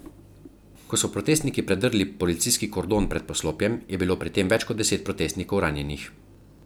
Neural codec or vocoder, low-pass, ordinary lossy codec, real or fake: none; none; none; real